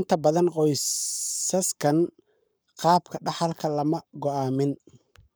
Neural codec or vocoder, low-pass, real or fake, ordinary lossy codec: codec, 44.1 kHz, 7.8 kbps, Pupu-Codec; none; fake; none